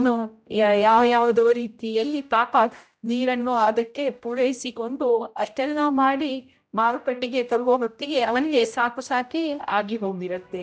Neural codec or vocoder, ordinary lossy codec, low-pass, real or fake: codec, 16 kHz, 0.5 kbps, X-Codec, HuBERT features, trained on general audio; none; none; fake